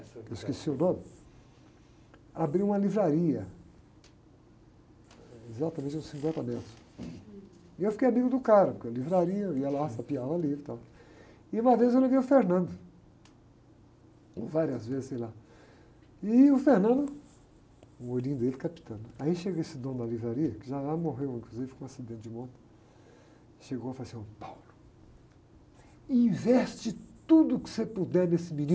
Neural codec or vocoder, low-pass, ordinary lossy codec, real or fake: none; none; none; real